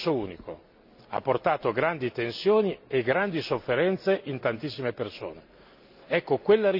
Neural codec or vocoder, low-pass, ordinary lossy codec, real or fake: none; 5.4 kHz; none; real